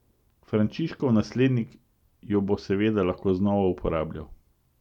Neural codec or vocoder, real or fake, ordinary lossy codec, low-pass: vocoder, 44.1 kHz, 128 mel bands every 256 samples, BigVGAN v2; fake; none; 19.8 kHz